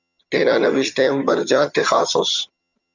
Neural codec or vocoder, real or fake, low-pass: vocoder, 22.05 kHz, 80 mel bands, HiFi-GAN; fake; 7.2 kHz